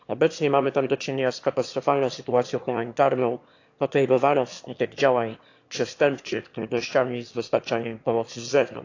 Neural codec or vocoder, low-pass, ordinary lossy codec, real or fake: autoencoder, 22.05 kHz, a latent of 192 numbers a frame, VITS, trained on one speaker; 7.2 kHz; AAC, 48 kbps; fake